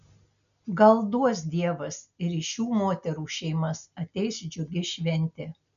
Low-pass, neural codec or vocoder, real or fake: 7.2 kHz; none; real